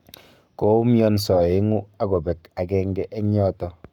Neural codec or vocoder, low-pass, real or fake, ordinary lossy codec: codec, 44.1 kHz, 7.8 kbps, Pupu-Codec; 19.8 kHz; fake; none